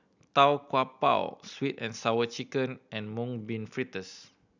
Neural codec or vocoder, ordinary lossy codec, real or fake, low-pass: none; none; real; 7.2 kHz